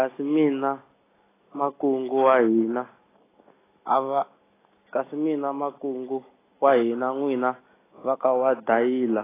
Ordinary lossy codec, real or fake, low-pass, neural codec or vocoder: AAC, 16 kbps; real; 3.6 kHz; none